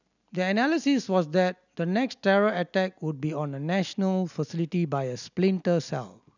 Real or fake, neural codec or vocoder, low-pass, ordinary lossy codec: real; none; 7.2 kHz; none